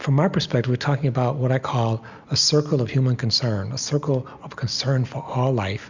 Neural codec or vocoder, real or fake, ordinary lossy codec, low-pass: none; real; Opus, 64 kbps; 7.2 kHz